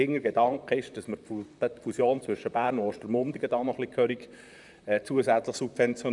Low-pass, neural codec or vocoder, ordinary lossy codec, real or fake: 10.8 kHz; vocoder, 44.1 kHz, 128 mel bands, Pupu-Vocoder; none; fake